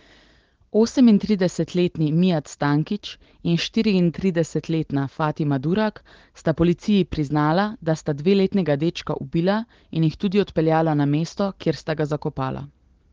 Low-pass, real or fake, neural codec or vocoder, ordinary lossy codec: 7.2 kHz; real; none; Opus, 16 kbps